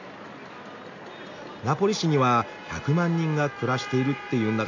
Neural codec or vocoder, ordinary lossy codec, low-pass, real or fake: none; AAC, 48 kbps; 7.2 kHz; real